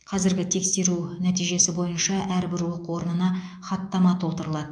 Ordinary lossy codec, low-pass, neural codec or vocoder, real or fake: none; 9.9 kHz; autoencoder, 48 kHz, 128 numbers a frame, DAC-VAE, trained on Japanese speech; fake